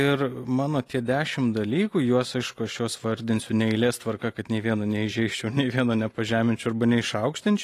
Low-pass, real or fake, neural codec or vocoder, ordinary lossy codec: 14.4 kHz; real; none; AAC, 48 kbps